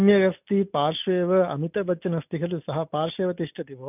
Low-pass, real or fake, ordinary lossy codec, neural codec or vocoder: 3.6 kHz; real; none; none